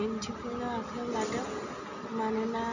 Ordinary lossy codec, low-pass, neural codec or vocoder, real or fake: none; 7.2 kHz; none; real